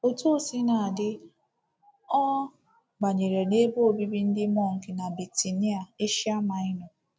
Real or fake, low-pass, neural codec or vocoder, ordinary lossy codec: real; none; none; none